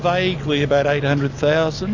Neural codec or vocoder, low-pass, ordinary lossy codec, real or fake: none; 7.2 kHz; MP3, 48 kbps; real